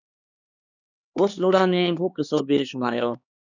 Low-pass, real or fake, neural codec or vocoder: 7.2 kHz; fake; codec, 16 kHz, 2 kbps, X-Codec, HuBERT features, trained on LibriSpeech